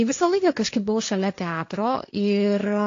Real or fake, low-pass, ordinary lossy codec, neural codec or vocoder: fake; 7.2 kHz; AAC, 48 kbps; codec, 16 kHz, 1.1 kbps, Voila-Tokenizer